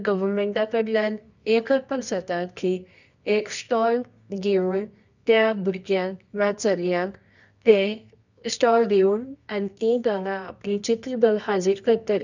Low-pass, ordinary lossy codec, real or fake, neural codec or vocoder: 7.2 kHz; none; fake; codec, 24 kHz, 0.9 kbps, WavTokenizer, medium music audio release